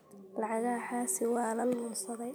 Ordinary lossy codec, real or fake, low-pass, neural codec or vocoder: none; real; none; none